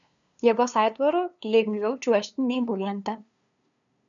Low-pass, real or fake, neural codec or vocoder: 7.2 kHz; fake; codec, 16 kHz, 2 kbps, FunCodec, trained on LibriTTS, 25 frames a second